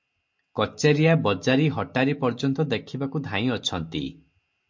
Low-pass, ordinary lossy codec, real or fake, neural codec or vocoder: 7.2 kHz; MP3, 48 kbps; real; none